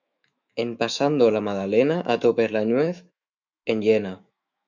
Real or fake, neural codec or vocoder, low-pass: fake; autoencoder, 48 kHz, 128 numbers a frame, DAC-VAE, trained on Japanese speech; 7.2 kHz